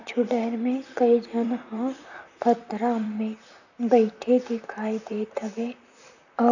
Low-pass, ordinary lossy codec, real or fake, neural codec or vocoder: 7.2 kHz; none; fake; vocoder, 44.1 kHz, 128 mel bands, Pupu-Vocoder